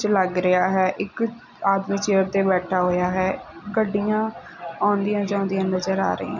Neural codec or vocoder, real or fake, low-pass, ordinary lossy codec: none; real; 7.2 kHz; none